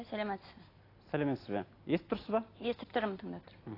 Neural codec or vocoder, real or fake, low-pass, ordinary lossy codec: none; real; 5.4 kHz; AAC, 24 kbps